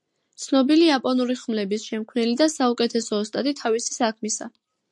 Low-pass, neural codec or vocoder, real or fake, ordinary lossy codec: 10.8 kHz; none; real; MP3, 64 kbps